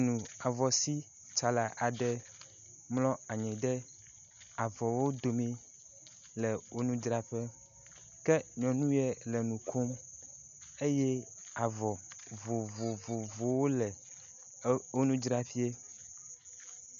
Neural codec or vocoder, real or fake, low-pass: none; real; 7.2 kHz